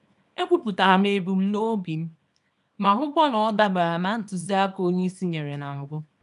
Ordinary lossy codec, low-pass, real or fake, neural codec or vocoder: none; 10.8 kHz; fake; codec, 24 kHz, 0.9 kbps, WavTokenizer, small release